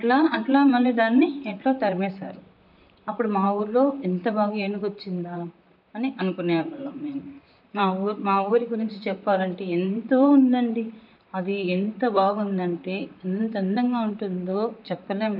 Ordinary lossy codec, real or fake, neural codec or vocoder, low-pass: none; fake; vocoder, 44.1 kHz, 128 mel bands, Pupu-Vocoder; 5.4 kHz